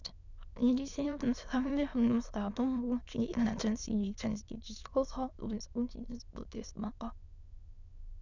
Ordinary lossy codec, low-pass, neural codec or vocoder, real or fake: none; 7.2 kHz; autoencoder, 22.05 kHz, a latent of 192 numbers a frame, VITS, trained on many speakers; fake